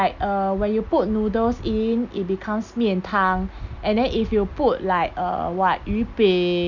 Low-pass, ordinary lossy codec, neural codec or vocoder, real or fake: 7.2 kHz; none; none; real